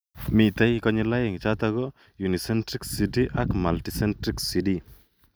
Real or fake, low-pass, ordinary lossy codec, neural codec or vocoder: real; none; none; none